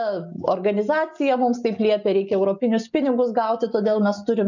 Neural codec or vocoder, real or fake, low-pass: none; real; 7.2 kHz